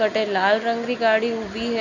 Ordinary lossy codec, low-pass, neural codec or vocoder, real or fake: none; 7.2 kHz; none; real